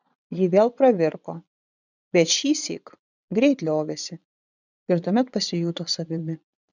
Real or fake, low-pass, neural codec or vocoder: fake; 7.2 kHz; vocoder, 22.05 kHz, 80 mel bands, Vocos